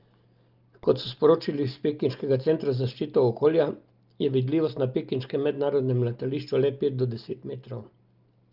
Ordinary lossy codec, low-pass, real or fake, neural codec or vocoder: Opus, 24 kbps; 5.4 kHz; real; none